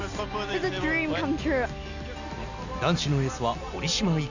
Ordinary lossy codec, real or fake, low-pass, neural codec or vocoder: none; real; 7.2 kHz; none